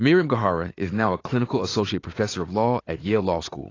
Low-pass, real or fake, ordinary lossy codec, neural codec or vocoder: 7.2 kHz; fake; AAC, 32 kbps; vocoder, 44.1 kHz, 80 mel bands, Vocos